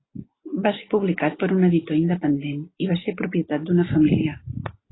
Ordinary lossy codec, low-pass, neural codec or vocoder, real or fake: AAC, 16 kbps; 7.2 kHz; none; real